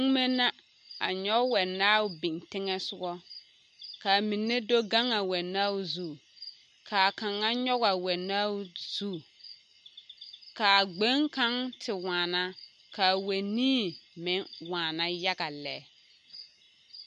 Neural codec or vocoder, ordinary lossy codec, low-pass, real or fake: none; MP3, 48 kbps; 14.4 kHz; real